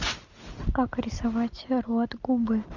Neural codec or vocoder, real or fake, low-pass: none; real; 7.2 kHz